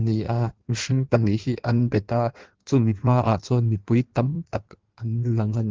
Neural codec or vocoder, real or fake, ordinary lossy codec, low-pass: codec, 16 kHz in and 24 kHz out, 1.1 kbps, FireRedTTS-2 codec; fake; Opus, 16 kbps; 7.2 kHz